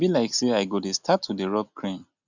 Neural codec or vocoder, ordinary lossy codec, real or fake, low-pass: none; Opus, 64 kbps; real; 7.2 kHz